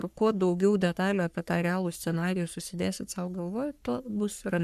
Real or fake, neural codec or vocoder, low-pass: fake; codec, 44.1 kHz, 3.4 kbps, Pupu-Codec; 14.4 kHz